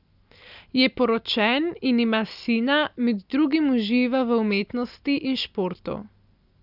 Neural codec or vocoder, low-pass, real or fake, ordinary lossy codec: none; 5.4 kHz; real; none